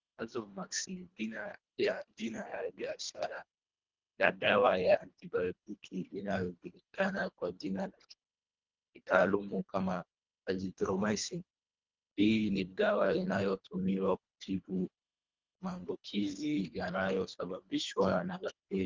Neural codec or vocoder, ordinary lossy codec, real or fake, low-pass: codec, 24 kHz, 1.5 kbps, HILCodec; Opus, 32 kbps; fake; 7.2 kHz